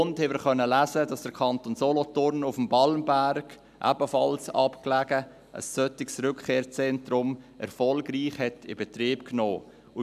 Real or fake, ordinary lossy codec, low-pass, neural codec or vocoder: real; none; 14.4 kHz; none